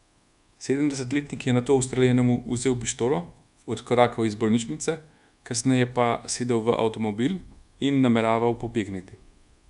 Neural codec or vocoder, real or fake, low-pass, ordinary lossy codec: codec, 24 kHz, 1.2 kbps, DualCodec; fake; 10.8 kHz; none